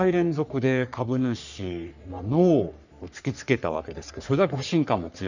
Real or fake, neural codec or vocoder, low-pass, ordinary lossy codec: fake; codec, 44.1 kHz, 3.4 kbps, Pupu-Codec; 7.2 kHz; none